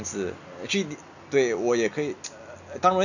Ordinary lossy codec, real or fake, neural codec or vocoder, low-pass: none; real; none; 7.2 kHz